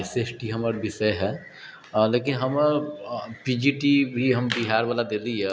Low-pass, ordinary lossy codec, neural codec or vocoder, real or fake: none; none; none; real